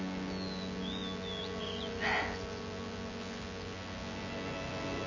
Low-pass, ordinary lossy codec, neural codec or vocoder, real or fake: 7.2 kHz; Opus, 64 kbps; vocoder, 24 kHz, 100 mel bands, Vocos; fake